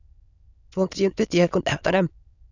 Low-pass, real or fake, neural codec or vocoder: 7.2 kHz; fake; autoencoder, 22.05 kHz, a latent of 192 numbers a frame, VITS, trained on many speakers